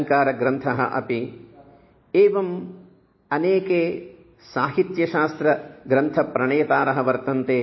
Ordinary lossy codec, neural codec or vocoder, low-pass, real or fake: MP3, 24 kbps; none; 7.2 kHz; real